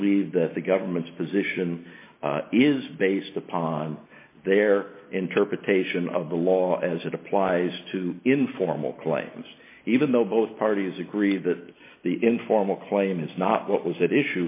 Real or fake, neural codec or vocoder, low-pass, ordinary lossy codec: real; none; 3.6 kHz; MP3, 24 kbps